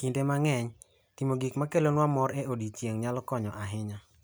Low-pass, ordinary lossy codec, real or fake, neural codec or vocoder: none; none; real; none